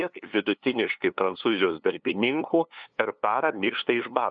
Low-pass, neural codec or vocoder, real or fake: 7.2 kHz; codec, 16 kHz, 2 kbps, FunCodec, trained on LibriTTS, 25 frames a second; fake